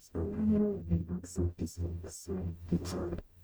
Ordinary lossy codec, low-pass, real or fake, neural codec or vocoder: none; none; fake; codec, 44.1 kHz, 0.9 kbps, DAC